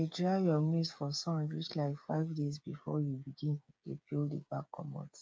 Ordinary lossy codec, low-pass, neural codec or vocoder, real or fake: none; none; codec, 16 kHz, 8 kbps, FreqCodec, smaller model; fake